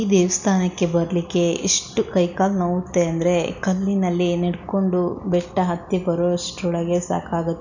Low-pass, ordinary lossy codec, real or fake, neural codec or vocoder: 7.2 kHz; none; real; none